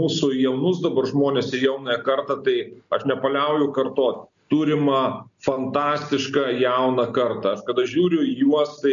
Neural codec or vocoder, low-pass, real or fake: none; 7.2 kHz; real